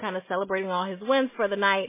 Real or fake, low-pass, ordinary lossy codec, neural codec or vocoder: real; 3.6 kHz; MP3, 16 kbps; none